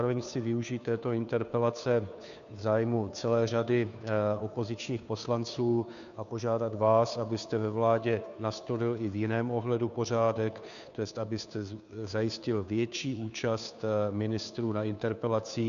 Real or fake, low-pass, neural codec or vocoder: fake; 7.2 kHz; codec, 16 kHz, 2 kbps, FunCodec, trained on Chinese and English, 25 frames a second